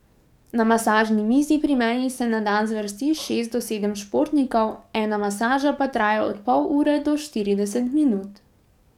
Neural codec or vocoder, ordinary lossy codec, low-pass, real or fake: codec, 44.1 kHz, 7.8 kbps, DAC; none; 19.8 kHz; fake